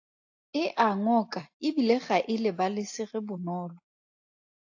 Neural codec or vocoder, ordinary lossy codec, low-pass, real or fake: none; AAC, 48 kbps; 7.2 kHz; real